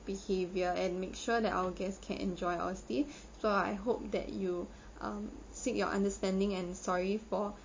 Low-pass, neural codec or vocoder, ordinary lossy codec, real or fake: 7.2 kHz; none; MP3, 32 kbps; real